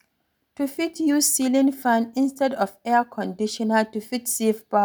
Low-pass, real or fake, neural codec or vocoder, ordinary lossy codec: none; real; none; none